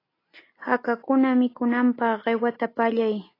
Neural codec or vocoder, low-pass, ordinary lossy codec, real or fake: none; 5.4 kHz; AAC, 24 kbps; real